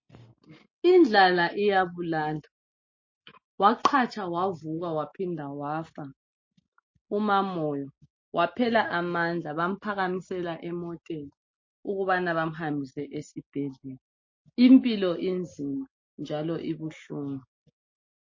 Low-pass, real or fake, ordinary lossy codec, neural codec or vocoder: 7.2 kHz; real; MP3, 32 kbps; none